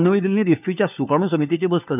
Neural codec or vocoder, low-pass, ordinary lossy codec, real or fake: codec, 16 kHz, 4 kbps, FunCodec, trained on LibriTTS, 50 frames a second; 3.6 kHz; none; fake